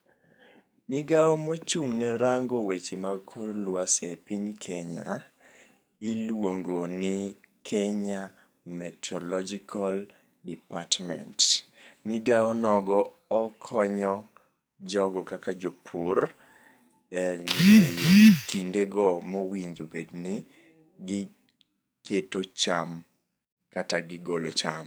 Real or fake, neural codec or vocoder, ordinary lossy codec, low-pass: fake; codec, 44.1 kHz, 2.6 kbps, SNAC; none; none